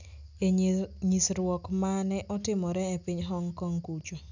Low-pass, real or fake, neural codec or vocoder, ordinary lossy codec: 7.2 kHz; real; none; none